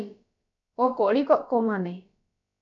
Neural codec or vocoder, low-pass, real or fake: codec, 16 kHz, about 1 kbps, DyCAST, with the encoder's durations; 7.2 kHz; fake